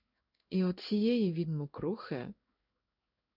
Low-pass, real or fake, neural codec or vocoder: 5.4 kHz; fake; codec, 16 kHz in and 24 kHz out, 1 kbps, XY-Tokenizer